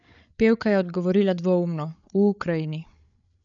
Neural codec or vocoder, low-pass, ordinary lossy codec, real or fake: codec, 16 kHz, 8 kbps, FreqCodec, larger model; 7.2 kHz; none; fake